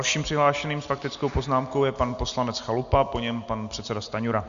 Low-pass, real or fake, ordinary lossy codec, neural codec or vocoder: 7.2 kHz; real; Opus, 64 kbps; none